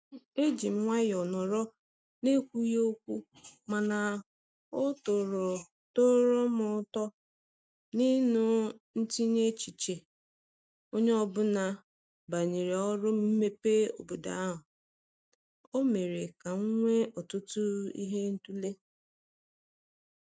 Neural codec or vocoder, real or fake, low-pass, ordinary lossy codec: none; real; none; none